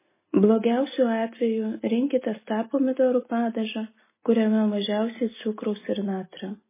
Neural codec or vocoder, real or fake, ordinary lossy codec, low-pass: none; real; MP3, 16 kbps; 3.6 kHz